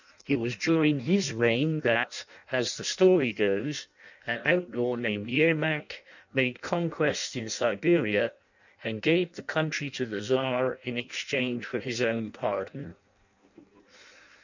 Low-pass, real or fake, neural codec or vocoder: 7.2 kHz; fake; codec, 16 kHz in and 24 kHz out, 0.6 kbps, FireRedTTS-2 codec